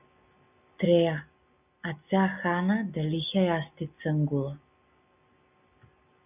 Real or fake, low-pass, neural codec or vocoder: real; 3.6 kHz; none